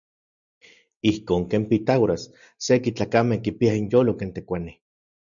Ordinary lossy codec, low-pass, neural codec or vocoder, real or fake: MP3, 96 kbps; 7.2 kHz; none; real